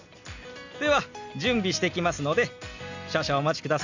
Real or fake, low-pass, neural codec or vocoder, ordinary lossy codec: real; 7.2 kHz; none; none